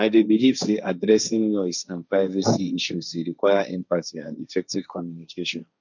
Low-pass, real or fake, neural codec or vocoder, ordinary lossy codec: 7.2 kHz; fake; codec, 16 kHz, 1.1 kbps, Voila-Tokenizer; none